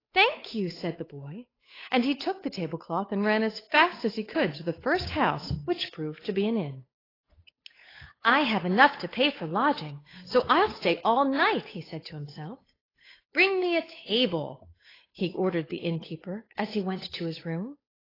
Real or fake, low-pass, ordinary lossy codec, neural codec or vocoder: fake; 5.4 kHz; AAC, 24 kbps; codec, 16 kHz, 8 kbps, FunCodec, trained on Chinese and English, 25 frames a second